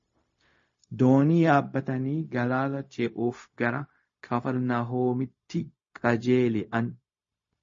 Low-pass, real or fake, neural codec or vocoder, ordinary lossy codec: 7.2 kHz; fake; codec, 16 kHz, 0.4 kbps, LongCat-Audio-Codec; MP3, 32 kbps